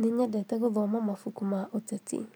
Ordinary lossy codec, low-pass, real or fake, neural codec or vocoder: none; none; real; none